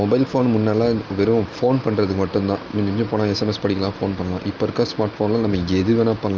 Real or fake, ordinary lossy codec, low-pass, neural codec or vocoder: real; Opus, 32 kbps; 7.2 kHz; none